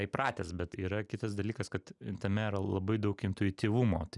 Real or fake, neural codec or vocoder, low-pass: real; none; 10.8 kHz